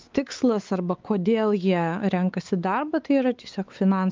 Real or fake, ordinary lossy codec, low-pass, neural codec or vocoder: fake; Opus, 24 kbps; 7.2 kHz; codec, 16 kHz, 4 kbps, X-Codec, WavLM features, trained on Multilingual LibriSpeech